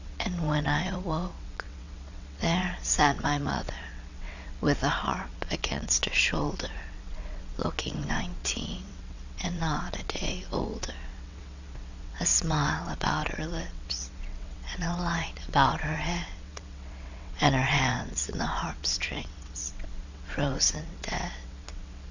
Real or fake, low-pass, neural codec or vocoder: fake; 7.2 kHz; vocoder, 22.05 kHz, 80 mel bands, WaveNeXt